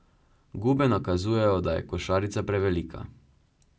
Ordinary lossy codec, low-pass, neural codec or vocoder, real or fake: none; none; none; real